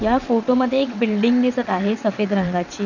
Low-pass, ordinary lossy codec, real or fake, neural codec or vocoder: 7.2 kHz; none; fake; vocoder, 22.05 kHz, 80 mel bands, WaveNeXt